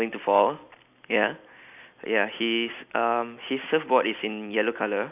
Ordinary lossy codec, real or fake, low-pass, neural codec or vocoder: none; real; 3.6 kHz; none